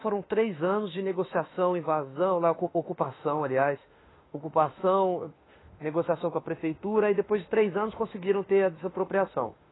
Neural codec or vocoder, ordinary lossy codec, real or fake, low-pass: autoencoder, 48 kHz, 32 numbers a frame, DAC-VAE, trained on Japanese speech; AAC, 16 kbps; fake; 7.2 kHz